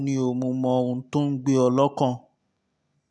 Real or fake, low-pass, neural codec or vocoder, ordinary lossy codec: real; none; none; none